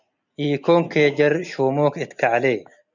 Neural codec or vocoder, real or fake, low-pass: none; real; 7.2 kHz